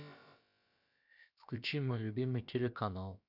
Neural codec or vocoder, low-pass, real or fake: codec, 16 kHz, about 1 kbps, DyCAST, with the encoder's durations; 5.4 kHz; fake